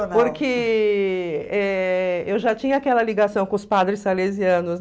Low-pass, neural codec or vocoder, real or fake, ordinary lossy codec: none; none; real; none